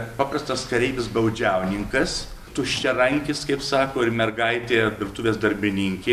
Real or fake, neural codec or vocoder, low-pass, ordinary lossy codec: real; none; 14.4 kHz; MP3, 96 kbps